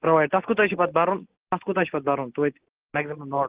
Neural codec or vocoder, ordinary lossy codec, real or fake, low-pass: none; Opus, 16 kbps; real; 3.6 kHz